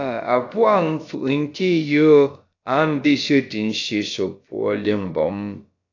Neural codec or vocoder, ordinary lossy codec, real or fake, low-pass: codec, 16 kHz, about 1 kbps, DyCAST, with the encoder's durations; AAC, 48 kbps; fake; 7.2 kHz